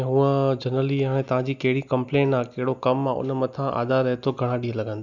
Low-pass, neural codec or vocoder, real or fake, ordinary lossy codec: 7.2 kHz; none; real; none